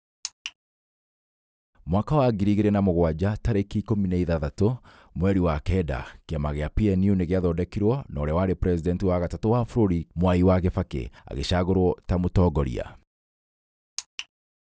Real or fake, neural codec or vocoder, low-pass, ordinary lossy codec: real; none; none; none